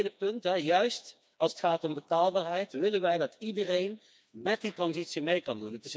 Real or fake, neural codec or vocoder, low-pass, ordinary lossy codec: fake; codec, 16 kHz, 2 kbps, FreqCodec, smaller model; none; none